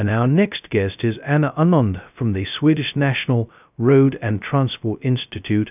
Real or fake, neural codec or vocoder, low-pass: fake; codec, 16 kHz, 0.2 kbps, FocalCodec; 3.6 kHz